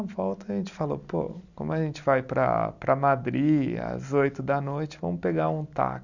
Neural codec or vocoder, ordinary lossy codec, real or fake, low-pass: none; none; real; 7.2 kHz